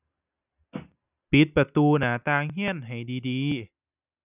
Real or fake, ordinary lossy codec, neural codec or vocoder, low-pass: real; none; none; 3.6 kHz